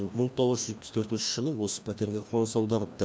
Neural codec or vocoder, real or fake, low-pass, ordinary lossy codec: codec, 16 kHz, 1 kbps, FreqCodec, larger model; fake; none; none